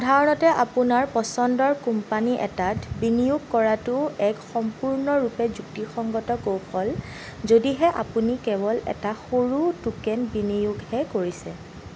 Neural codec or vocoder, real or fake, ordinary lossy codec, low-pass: none; real; none; none